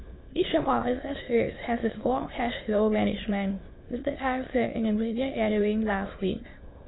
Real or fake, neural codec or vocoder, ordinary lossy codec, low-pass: fake; autoencoder, 22.05 kHz, a latent of 192 numbers a frame, VITS, trained on many speakers; AAC, 16 kbps; 7.2 kHz